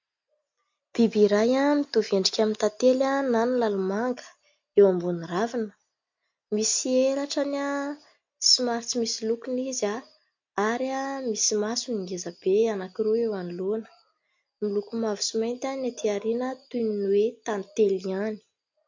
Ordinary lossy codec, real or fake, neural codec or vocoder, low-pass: MP3, 48 kbps; real; none; 7.2 kHz